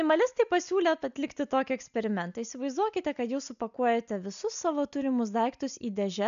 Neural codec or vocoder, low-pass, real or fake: none; 7.2 kHz; real